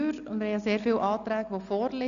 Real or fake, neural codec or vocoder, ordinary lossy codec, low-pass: real; none; none; 7.2 kHz